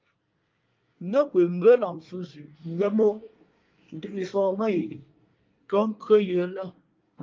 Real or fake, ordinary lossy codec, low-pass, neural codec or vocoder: fake; Opus, 24 kbps; 7.2 kHz; codec, 24 kHz, 1 kbps, SNAC